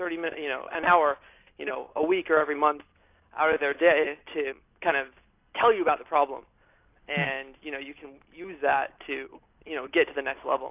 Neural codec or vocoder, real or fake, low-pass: none; real; 3.6 kHz